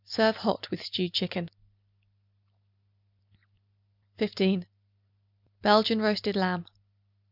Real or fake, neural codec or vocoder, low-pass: real; none; 5.4 kHz